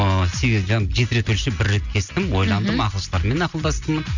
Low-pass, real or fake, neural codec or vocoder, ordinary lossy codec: 7.2 kHz; real; none; none